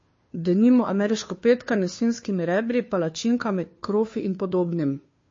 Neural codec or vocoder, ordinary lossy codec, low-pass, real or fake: codec, 16 kHz, 2 kbps, FunCodec, trained on Chinese and English, 25 frames a second; MP3, 32 kbps; 7.2 kHz; fake